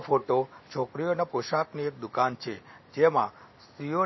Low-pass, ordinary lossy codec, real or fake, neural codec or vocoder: 7.2 kHz; MP3, 24 kbps; real; none